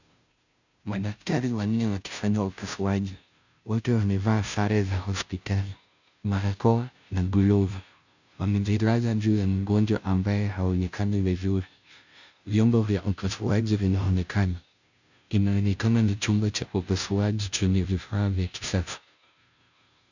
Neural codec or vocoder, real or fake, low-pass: codec, 16 kHz, 0.5 kbps, FunCodec, trained on Chinese and English, 25 frames a second; fake; 7.2 kHz